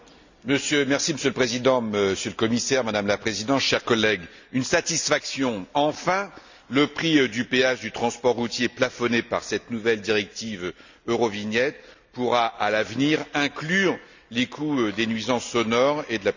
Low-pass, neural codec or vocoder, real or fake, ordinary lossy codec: 7.2 kHz; none; real; Opus, 64 kbps